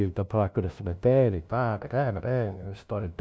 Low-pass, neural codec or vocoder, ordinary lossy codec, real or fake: none; codec, 16 kHz, 0.5 kbps, FunCodec, trained on LibriTTS, 25 frames a second; none; fake